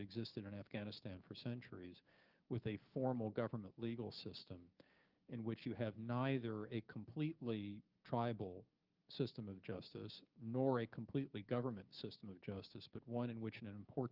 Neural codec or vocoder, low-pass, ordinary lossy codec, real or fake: codec, 16 kHz, 6 kbps, DAC; 5.4 kHz; Opus, 32 kbps; fake